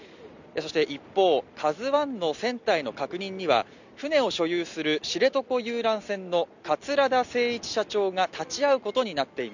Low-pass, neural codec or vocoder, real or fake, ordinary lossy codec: 7.2 kHz; none; real; none